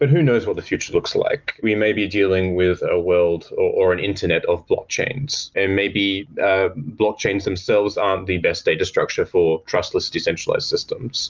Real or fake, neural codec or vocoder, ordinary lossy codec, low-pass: real; none; Opus, 24 kbps; 7.2 kHz